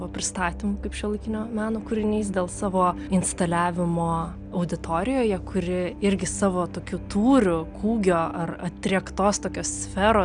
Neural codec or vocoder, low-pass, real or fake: none; 9.9 kHz; real